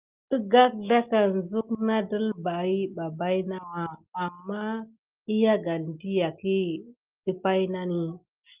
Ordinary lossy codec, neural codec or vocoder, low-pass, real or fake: Opus, 24 kbps; none; 3.6 kHz; real